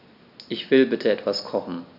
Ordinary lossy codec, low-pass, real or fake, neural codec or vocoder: none; 5.4 kHz; real; none